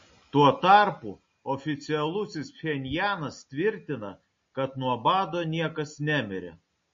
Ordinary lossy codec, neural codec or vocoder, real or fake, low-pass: MP3, 32 kbps; none; real; 7.2 kHz